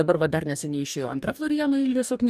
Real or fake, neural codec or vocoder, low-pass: fake; codec, 44.1 kHz, 2.6 kbps, DAC; 14.4 kHz